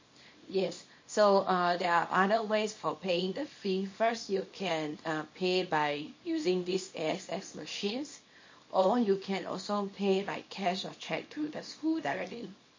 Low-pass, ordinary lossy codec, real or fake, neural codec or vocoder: 7.2 kHz; MP3, 32 kbps; fake; codec, 24 kHz, 0.9 kbps, WavTokenizer, small release